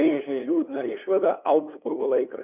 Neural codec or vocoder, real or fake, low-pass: codec, 16 kHz, 2 kbps, FunCodec, trained on LibriTTS, 25 frames a second; fake; 3.6 kHz